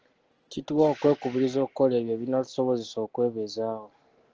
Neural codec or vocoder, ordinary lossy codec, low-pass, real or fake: none; Opus, 16 kbps; 7.2 kHz; real